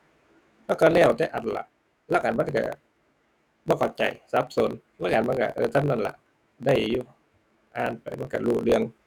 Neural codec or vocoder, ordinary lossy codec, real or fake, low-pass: autoencoder, 48 kHz, 128 numbers a frame, DAC-VAE, trained on Japanese speech; none; fake; none